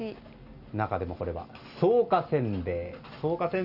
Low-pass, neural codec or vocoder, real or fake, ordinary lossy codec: 5.4 kHz; none; real; none